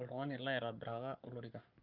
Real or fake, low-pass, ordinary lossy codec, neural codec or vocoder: fake; 5.4 kHz; Opus, 32 kbps; codec, 44.1 kHz, 7.8 kbps, Pupu-Codec